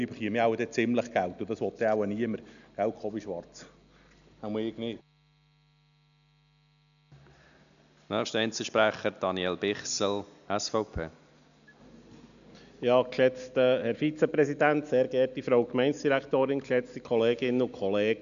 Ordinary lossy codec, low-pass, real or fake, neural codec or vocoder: none; 7.2 kHz; real; none